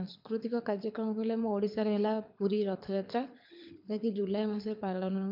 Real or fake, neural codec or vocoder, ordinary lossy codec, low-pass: fake; codec, 24 kHz, 6 kbps, HILCodec; MP3, 48 kbps; 5.4 kHz